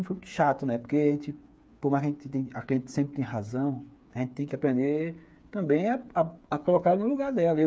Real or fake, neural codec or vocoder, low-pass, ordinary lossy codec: fake; codec, 16 kHz, 8 kbps, FreqCodec, smaller model; none; none